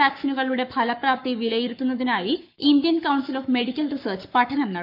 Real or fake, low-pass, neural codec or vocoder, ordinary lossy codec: fake; 5.4 kHz; codec, 44.1 kHz, 7.8 kbps, Pupu-Codec; AAC, 48 kbps